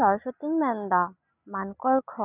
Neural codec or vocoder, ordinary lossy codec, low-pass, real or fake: none; none; 3.6 kHz; real